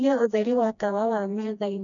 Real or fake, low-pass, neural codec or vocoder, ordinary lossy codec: fake; 7.2 kHz; codec, 16 kHz, 1 kbps, FreqCodec, smaller model; none